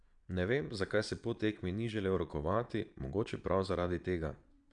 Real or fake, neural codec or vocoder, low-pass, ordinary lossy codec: fake; vocoder, 22.05 kHz, 80 mel bands, Vocos; 9.9 kHz; none